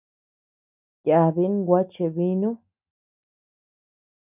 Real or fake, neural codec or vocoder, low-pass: real; none; 3.6 kHz